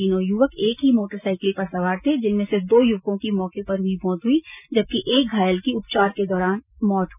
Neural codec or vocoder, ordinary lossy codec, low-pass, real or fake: none; none; 3.6 kHz; real